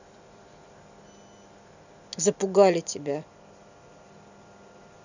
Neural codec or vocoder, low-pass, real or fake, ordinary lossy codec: none; 7.2 kHz; real; none